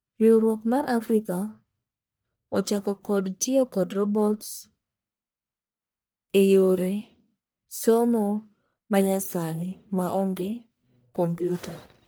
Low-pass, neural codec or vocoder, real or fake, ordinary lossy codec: none; codec, 44.1 kHz, 1.7 kbps, Pupu-Codec; fake; none